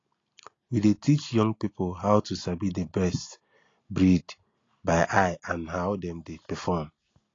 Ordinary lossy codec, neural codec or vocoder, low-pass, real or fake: AAC, 32 kbps; none; 7.2 kHz; real